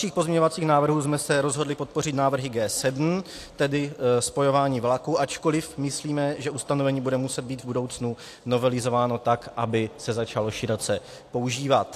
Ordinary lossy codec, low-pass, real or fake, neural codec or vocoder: AAC, 64 kbps; 14.4 kHz; real; none